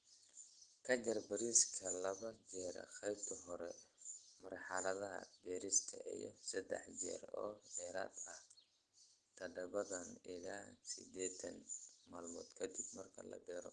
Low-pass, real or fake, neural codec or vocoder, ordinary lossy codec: 9.9 kHz; real; none; Opus, 16 kbps